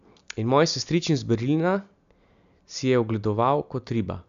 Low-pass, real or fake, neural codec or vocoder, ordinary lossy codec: 7.2 kHz; real; none; AAC, 96 kbps